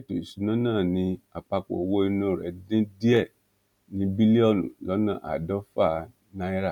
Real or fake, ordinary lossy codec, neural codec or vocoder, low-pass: real; none; none; 19.8 kHz